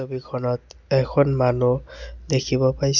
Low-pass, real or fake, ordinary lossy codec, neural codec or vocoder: 7.2 kHz; real; none; none